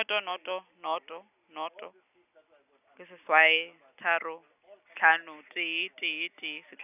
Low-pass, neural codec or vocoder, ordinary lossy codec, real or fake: 3.6 kHz; none; none; real